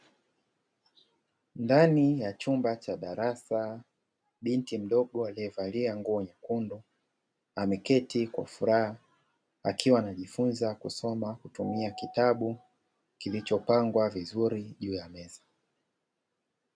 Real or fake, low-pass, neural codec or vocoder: real; 9.9 kHz; none